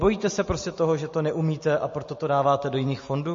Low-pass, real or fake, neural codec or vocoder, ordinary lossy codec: 7.2 kHz; real; none; MP3, 32 kbps